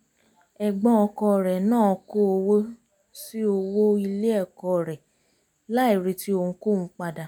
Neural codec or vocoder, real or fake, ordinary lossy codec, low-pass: none; real; none; none